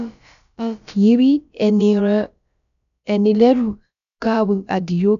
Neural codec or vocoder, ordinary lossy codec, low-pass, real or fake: codec, 16 kHz, about 1 kbps, DyCAST, with the encoder's durations; none; 7.2 kHz; fake